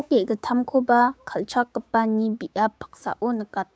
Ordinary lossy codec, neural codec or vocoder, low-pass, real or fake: none; codec, 16 kHz, 6 kbps, DAC; none; fake